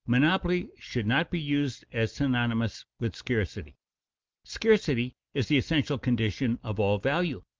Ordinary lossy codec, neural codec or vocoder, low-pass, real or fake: Opus, 32 kbps; none; 7.2 kHz; real